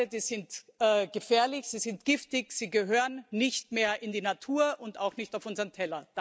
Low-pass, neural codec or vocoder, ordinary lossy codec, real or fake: none; none; none; real